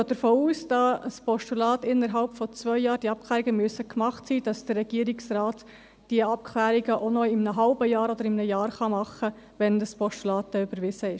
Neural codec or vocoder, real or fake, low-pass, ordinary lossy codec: none; real; none; none